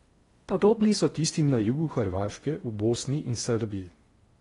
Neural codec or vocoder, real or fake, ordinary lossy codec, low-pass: codec, 16 kHz in and 24 kHz out, 0.6 kbps, FocalCodec, streaming, 2048 codes; fake; AAC, 32 kbps; 10.8 kHz